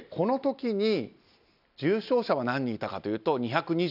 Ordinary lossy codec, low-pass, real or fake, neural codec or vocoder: none; 5.4 kHz; real; none